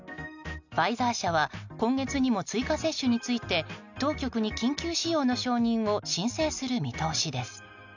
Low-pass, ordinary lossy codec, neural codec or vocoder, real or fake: 7.2 kHz; none; none; real